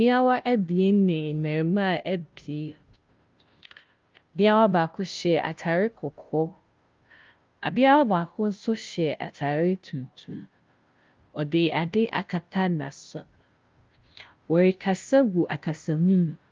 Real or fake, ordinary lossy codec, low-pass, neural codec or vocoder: fake; Opus, 32 kbps; 7.2 kHz; codec, 16 kHz, 0.5 kbps, FunCodec, trained on Chinese and English, 25 frames a second